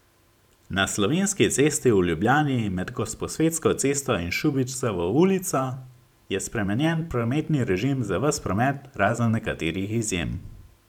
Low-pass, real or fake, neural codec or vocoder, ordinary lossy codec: 19.8 kHz; fake; vocoder, 44.1 kHz, 128 mel bands every 512 samples, BigVGAN v2; none